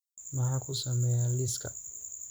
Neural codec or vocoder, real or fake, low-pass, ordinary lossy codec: vocoder, 44.1 kHz, 128 mel bands every 256 samples, BigVGAN v2; fake; none; none